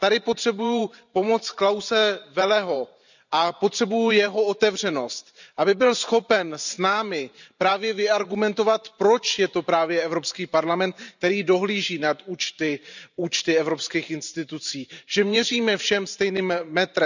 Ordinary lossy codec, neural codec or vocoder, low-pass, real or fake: none; vocoder, 44.1 kHz, 128 mel bands every 512 samples, BigVGAN v2; 7.2 kHz; fake